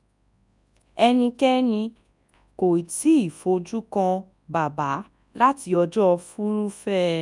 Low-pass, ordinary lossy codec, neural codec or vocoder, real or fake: 10.8 kHz; none; codec, 24 kHz, 0.9 kbps, WavTokenizer, large speech release; fake